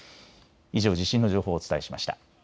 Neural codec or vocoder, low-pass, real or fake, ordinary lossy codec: none; none; real; none